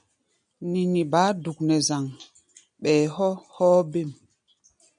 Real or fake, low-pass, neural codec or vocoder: real; 9.9 kHz; none